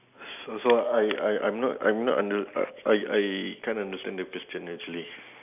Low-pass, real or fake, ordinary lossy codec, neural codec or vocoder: 3.6 kHz; real; none; none